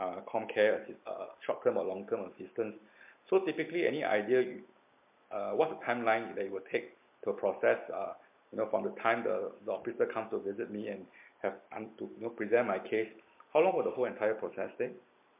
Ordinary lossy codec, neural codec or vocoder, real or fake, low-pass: MP3, 32 kbps; none; real; 3.6 kHz